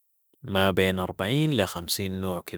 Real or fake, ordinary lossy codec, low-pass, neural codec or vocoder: fake; none; none; autoencoder, 48 kHz, 32 numbers a frame, DAC-VAE, trained on Japanese speech